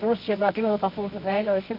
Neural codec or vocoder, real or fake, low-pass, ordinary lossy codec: codec, 24 kHz, 0.9 kbps, WavTokenizer, medium music audio release; fake; 5.4 kHz; none